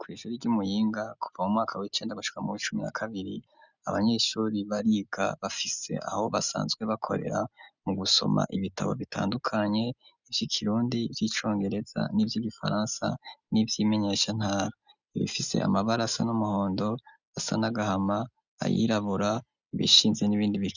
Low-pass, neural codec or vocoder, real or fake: 7.2 kHz; none; real